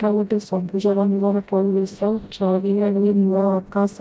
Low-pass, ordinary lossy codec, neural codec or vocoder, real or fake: none; none; codec, 16 kHz, 0.5 kbps, FreqCodec, smaller model; fake